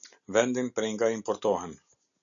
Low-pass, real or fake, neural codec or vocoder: 7.2 kHz; real; none